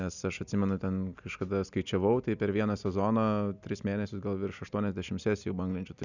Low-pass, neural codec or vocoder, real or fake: 7.2 kHz; none; real